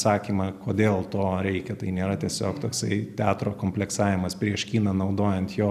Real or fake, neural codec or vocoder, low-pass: real; none; 14.4 kHz